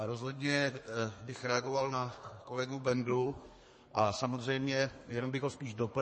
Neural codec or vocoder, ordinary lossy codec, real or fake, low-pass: codec, 32 kHz, 1.9 kbps, SNAC; MP3, 32 kbps; fake; 10.8 kHz